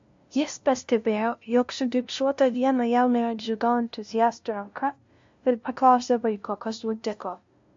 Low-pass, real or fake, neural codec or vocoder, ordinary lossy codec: 7.2 kHz; fake; codec, 16 kHz, 0.5 kbps, FunCodec, trained on LibriTTS, 25 frames a second; MP3, 48 kbps